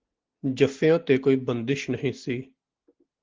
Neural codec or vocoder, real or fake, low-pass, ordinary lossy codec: none; real; 7.2 kHz; Opus, 16 kbps